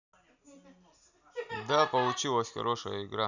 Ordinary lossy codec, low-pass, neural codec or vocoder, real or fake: none; 7.2 kHz; none; real